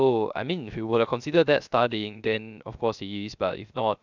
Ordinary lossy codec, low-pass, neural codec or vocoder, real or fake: none; 7.2 kHz; codec, 16 kHz, 0.3 kbps, FocalCodec; fake